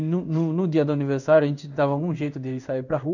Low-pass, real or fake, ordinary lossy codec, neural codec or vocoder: 7.2 kHz; fake; none; codec, 16 kHz in and 24 kHz out, 1 kbps, XY-Tokenizer